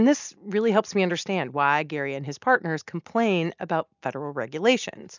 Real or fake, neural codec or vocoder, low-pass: real; none; 7.2 kHz